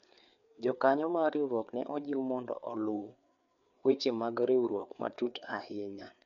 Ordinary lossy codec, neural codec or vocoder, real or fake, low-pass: none; codec, 16 kHz, 4 kbps, FreqCodec, larger model; fake; 7.2 kHz